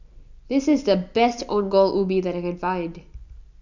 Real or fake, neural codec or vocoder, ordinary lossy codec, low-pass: real; none; none; 7.2 kHz